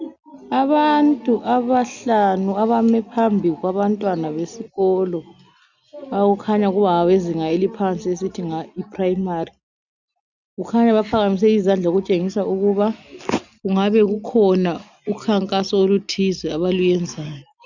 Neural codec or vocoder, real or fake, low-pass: none; real; 7.2 kHz